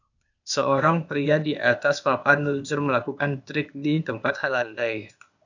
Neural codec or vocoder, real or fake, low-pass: codec, 16 kHz, 0.8 kbps, ZipCodec; fake; 7.2 kHz